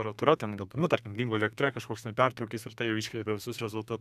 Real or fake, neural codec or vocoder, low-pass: fake; codec, 32 kHz, 1.9 kbps, SNAC; 14.4 kHz